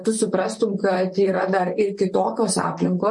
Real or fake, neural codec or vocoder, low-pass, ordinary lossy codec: fake; vocoder, 44.1 kHz, 128 mel bands, Pupu-Vocoder; 10.8 kHz; MP3, 48 kbps